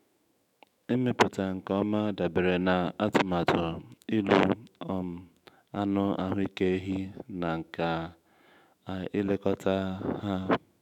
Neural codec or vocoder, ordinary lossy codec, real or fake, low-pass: autoencoder, 48 kHz, 128 numbers a frame, DAC-VAE, trained on Japanese speech; none; fake; 19.8 kHz